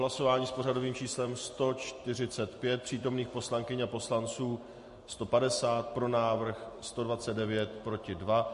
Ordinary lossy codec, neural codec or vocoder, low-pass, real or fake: MP3, 48 kbps; none; 14.4 kHz; real